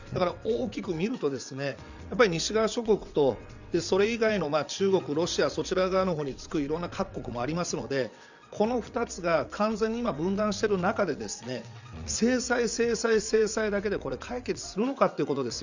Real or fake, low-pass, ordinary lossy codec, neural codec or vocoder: fake; 7.2 kHz; none; vocoder, 22.05 kHz, 80 mel bands, WaveNeXt